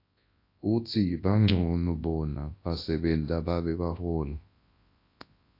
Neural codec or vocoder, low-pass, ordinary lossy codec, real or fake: codec, 24 kHz, 0.9 kbps, WavTokenizer, large speech release; 5.4 kHz; AAC, 32 kbps; fake